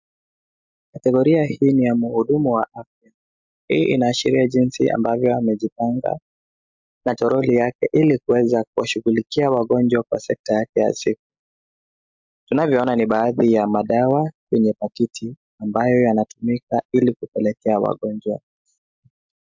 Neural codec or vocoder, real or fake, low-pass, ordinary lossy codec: none; real; 7.2 kHz; MP3, 64 kbps